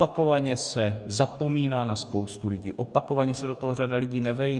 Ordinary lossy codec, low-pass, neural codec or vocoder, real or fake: Opus, 64 kbps; 10.8 kHz; codec, 44.1 kHz, 2.6 kbps, DAC; fake